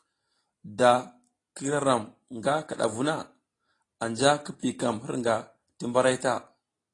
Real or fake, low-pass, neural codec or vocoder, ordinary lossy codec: real; 10.8 kHz; none; AAC, 32 kbps